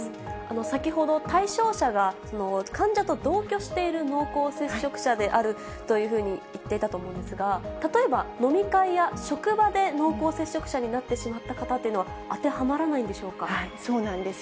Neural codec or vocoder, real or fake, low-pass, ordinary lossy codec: none; real; none; none